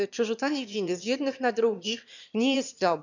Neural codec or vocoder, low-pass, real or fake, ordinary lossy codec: autoencoder, 22.05 kHz, a latent of 192 numbers a frame, VITS, trained on one speaker; 7.2 kHz; fake; none